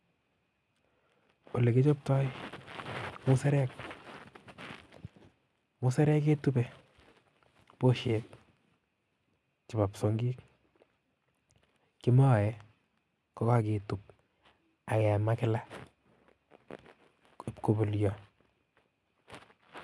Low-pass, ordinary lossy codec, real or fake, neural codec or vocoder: none; none; real; none